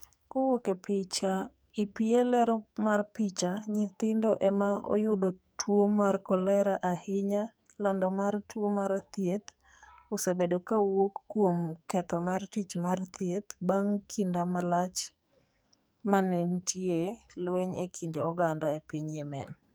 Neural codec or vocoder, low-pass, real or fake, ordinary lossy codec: codec, 44.1 kHz, 2.6 kbps, SNAC; none; fake; none